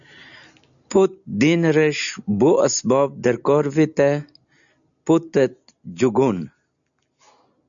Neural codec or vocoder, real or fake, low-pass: none; real; 7.2 kHz